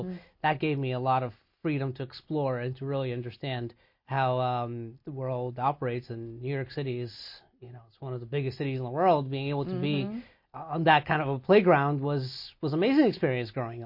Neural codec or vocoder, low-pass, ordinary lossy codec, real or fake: none; 5.4 kHz; MP3, 32 kbps; real